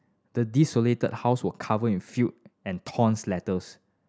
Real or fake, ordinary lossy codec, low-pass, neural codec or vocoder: real; none; none; none